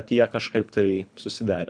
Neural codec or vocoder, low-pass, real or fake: codec, 24 kHz, 3 kbps, HILCodec; 9.9 kHz; fake